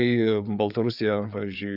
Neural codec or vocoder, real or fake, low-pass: codec, 16 kHz, 4 kbps, FreqCodec, larger model; fake; 5.4 kHz